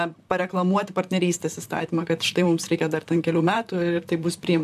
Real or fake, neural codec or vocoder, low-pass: fake; vocoder, 44.1 kHz, 128 mel bands, Pupu-Vocoder; 14.4 kHz